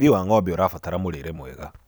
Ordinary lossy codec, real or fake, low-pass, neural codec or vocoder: none; fake; none; vocoder, 44.1 kHz, 128 mel bands every 256 samples, BigVGAN v2